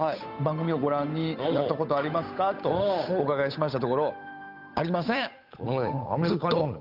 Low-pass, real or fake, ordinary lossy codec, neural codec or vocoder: 5.4 kHz; fake; none; codec, 16 kHz, 8 kbps, FunCodec, trained on Chinese and English, 25 frames a second